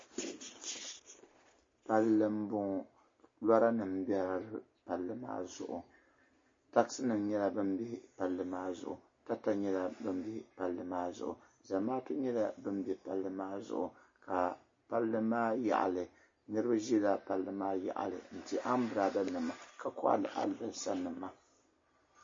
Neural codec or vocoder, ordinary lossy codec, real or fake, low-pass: none; MP3, 32 kbps; real; 7.2 kHz